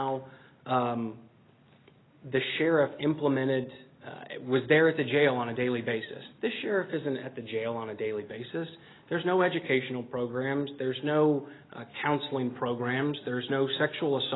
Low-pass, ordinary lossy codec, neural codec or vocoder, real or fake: 7.2 kHz; AAC, 16 kbps; none; real